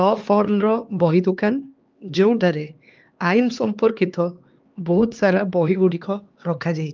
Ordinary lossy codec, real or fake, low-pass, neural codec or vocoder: Opus, 24 kbps; fake; 7.2 kHz; codec, 16 kHz, 2 kbps, X-Codec, HuBERT features, trained on LibriSpeech